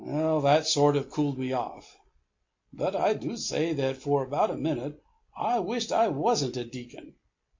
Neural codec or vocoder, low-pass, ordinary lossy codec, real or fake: none; 7.2 kHz; MP3, 48 kbps; real